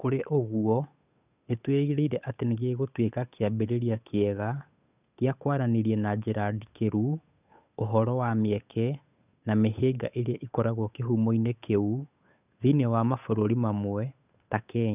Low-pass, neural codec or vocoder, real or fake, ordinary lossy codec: 3.6 kHz; codec, 16 kHz, 8 kbps, FunCodec, trained on Chinese and English, 25 frames a second; fake; none